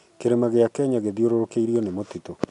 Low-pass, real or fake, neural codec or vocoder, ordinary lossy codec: 10.8 kHz; real; none; none